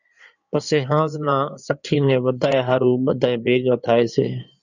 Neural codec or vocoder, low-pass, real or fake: codec, 16 kHz in and 24 kHz out, 2.2 kbps, FireRedTTS-2 codec; 7.2 kHz; fake